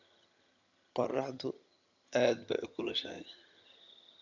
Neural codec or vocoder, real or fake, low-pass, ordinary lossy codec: vocoder, 22.05 kHz, 80 mel bands, HiFi-GAN; fake; 7.2 kHz; MP3, 64 kbps